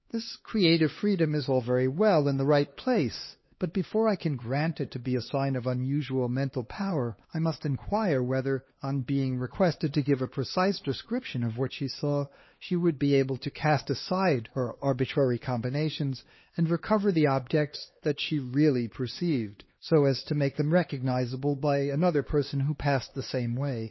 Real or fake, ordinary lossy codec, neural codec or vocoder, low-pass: fake; MP3, 24 kbps; codec, 16 kHz, 2 kbps, X-Codec, HuBERT features, trained on LibriSpeech; 7.2 kHz